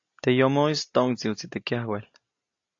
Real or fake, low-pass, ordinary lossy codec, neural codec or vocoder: real; 7.2 kHz; AAC, 48 kbps; none